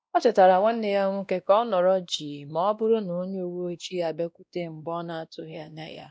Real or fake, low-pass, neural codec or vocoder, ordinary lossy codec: fake; none; codec, 16 kHz, 1 kbps, X-Codec, WavLM features, trained on Multilingual LibriSpeech; none